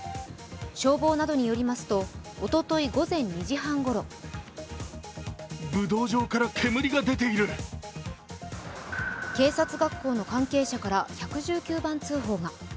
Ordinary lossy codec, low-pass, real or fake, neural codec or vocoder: none; none; real; none